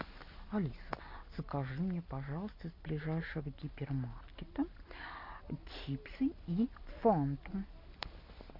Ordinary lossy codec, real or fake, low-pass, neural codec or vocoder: MP3, 32 kbps; real; 5.4 kHz; none